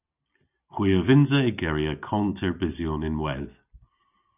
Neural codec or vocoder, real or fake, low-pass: none; real; 3.6 kHz